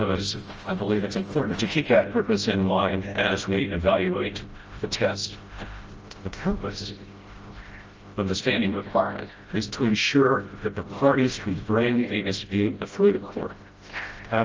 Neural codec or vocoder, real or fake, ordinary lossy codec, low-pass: codec, 16 kHz, 0.5 kbps, FreqCodec, smaller model; fake; Opus, 24 kbps; 7.2 kHz